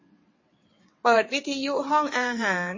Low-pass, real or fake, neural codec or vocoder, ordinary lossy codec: 7.2 kHz; fake; vocoder, 22.05 kHz, 80 mel bands, WaveNeXt; MP3, 32 kbps